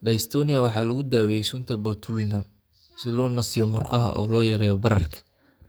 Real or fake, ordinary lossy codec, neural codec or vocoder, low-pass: fake; none; codec, 44.1 kHz, 2.6 kbps, SNAC; none